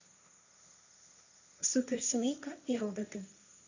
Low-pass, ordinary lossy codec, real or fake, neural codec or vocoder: 7.2 kHz; none; fake; codec, 16 kHz, 1.1 kbps, Voila-Tokenizer